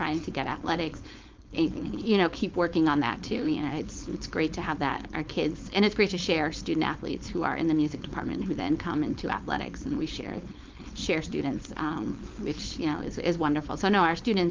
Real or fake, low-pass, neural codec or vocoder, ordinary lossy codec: fake; 7.2 kHz; codec, 16 kHz, 4.8 kbps, FACodec; Opus, 24 kbps